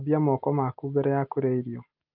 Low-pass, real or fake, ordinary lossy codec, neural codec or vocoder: 5.4 kHz; real; Opus, 24 kbps; none